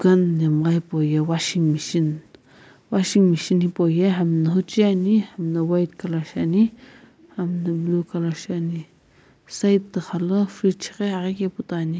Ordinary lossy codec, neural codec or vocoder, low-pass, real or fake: none; none; none; real